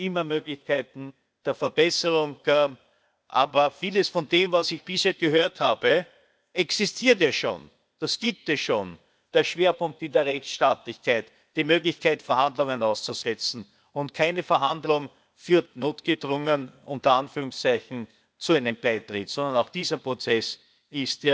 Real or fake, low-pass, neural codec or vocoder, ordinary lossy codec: fake; none; codec, 16 kHz, 0.8 kbps, ZipCodec; none